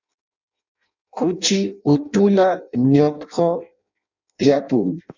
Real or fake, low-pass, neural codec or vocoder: fake; 7.2 kHz; codec, 16 kHz in and 24 kHz out, 0.6 kbps, FireRedTTS-2 codec